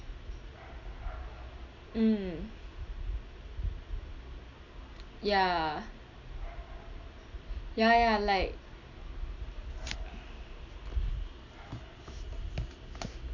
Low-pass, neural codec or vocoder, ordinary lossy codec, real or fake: 7.2 kHz; none; none; real